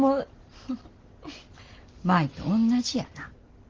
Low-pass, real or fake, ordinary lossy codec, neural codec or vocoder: 7.2 kHz; real; Opus, 16 kbps; none